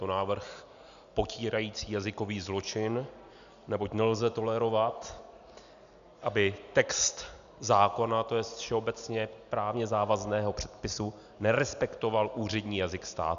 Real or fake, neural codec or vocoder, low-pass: real; none; 7.2 kHz